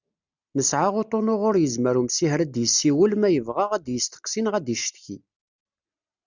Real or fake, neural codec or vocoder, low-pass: real; none; 7.2 kHz